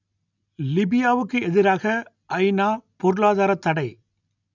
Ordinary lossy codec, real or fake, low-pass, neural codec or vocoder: none; real; 7.2 kHz; none